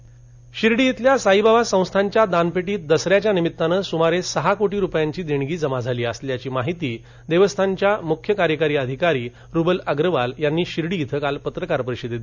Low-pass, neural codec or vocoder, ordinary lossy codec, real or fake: 7.2 kHz; none; none; real